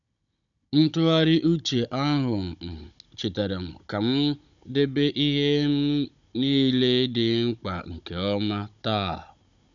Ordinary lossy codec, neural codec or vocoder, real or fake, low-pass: none; codec, 16 kHz, 16 kbps, FunCodec, trained on Chinese and English, 50 frames a second; fake; 7.2 kHz